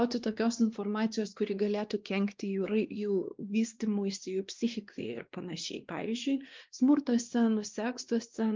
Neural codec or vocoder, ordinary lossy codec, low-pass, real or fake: codec, 16 kHz, 2 kbps, X-Codec, WavLM features, trained on Multilingual LibriSpeech; Opus, 24 kbps; 7.2 kHz; fake